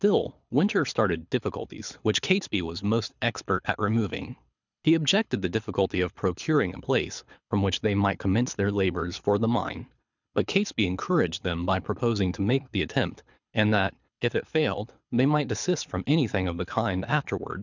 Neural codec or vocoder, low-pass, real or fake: codec, 24 kHz, 6 kbps, HILCodec; 7.2 kHz; fake